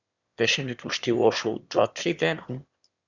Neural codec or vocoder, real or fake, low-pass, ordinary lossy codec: autoencoder, 22.05 kHz, a latent of 192 numbers a frame, VITS, trained on one speaker; fake; 7.2 kHz; Opus, 64 kbps